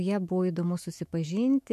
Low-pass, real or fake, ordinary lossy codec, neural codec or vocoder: 14.4 kHz; fake; MP3, 64 kbps; autoencoder, 48 kHz, 128 numbers a frame, DAC-VAE, trained on Japanese speech